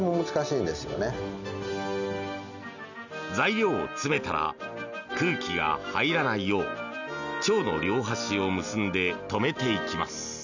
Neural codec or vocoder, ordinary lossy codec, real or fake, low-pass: none; none; real; 7.2 kHz